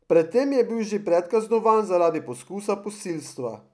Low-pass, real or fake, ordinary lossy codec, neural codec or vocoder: none; real; none; none